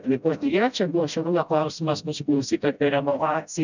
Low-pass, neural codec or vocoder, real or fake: 7.2 kHz; codec, 16 kHz, 0.5 kbps, FreqCodec, smaller model; fake